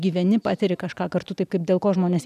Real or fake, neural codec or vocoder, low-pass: real; none; 14.4 kHz